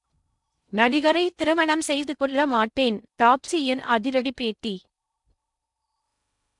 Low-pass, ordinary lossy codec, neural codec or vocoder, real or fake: 10.8 kHz; none; codec, 16 kHz in and 24 kHz out, 0.6 kbps, FocalCodec, streaming, 2048 codes; fake